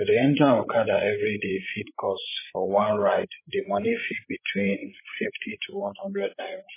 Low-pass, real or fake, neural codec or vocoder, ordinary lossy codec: 3.6 kHz; fake; codec, 16 kHz, 16 kbps, FreqCodec, larger model; MP3, 16 kbps